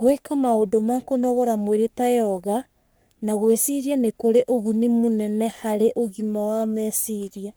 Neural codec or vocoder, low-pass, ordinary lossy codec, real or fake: codec, 44.1 kHz, 3.4 kbps, Pupu-Codec; none; none; fake